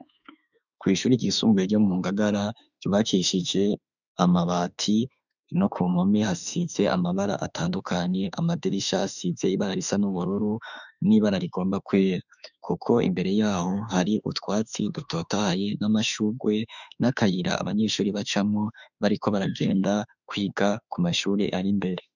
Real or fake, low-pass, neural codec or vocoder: fake; 7.2 kHz; autoencoder, 48 kHz, 32 numbers a frame, DAC-VAE, trained on Japanese speech